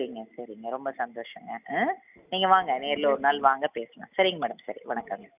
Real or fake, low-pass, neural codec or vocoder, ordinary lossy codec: real; 3.6 kHz; none; none